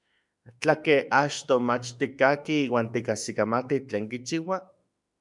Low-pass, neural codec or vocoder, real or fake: 10.8 kHz; autoencoder, 48 kHz, 32 numbers a frame, DAC-VAE, trained on Japanese speech; fake